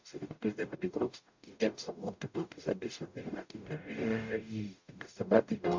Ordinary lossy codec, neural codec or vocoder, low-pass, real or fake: MP3, 64 kbps; codec, 44.1 kHz, 0.9 kbps, DAC; 7.2 kHz; fake